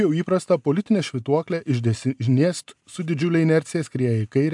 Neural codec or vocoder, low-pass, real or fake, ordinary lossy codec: none; 10.8 kHz; real; MP3, 96 kbps